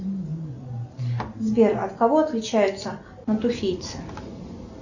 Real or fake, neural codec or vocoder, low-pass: real; none; 7.2 kHz